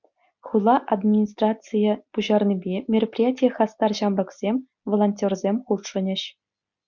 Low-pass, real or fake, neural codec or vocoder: 7.2 kHz; real; none